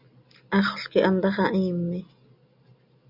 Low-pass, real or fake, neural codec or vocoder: 5.4 kHz; real; none